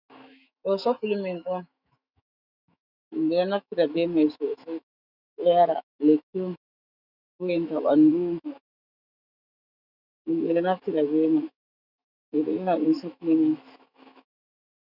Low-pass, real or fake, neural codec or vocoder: 5.4 kHz; fake; autoencoder, 48 kHz, 128 numbers a frame, DAC-VAE, trained on Japanese speech